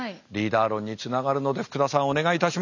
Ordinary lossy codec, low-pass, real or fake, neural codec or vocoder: none; 7.2 kHz; real; none